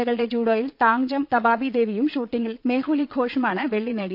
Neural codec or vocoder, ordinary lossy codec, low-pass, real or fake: vocoder, 22.05 kHz, 80 mel bands, Vocos; none; 5.4 kHz; fake